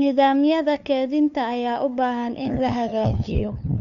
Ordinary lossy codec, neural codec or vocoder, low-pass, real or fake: none; codec, 16 kHz, 2 kbps, FunCodec, trained on LibriTTS, 25 frames a second; 7.2 kHz; fake